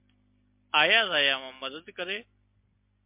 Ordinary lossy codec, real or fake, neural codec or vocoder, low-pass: MP3, 32 kbps; real; none; 3.6 kHz